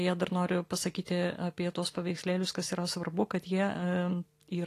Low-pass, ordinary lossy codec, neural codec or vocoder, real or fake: 14.4 kHz; AAC, 48 kbps; none; real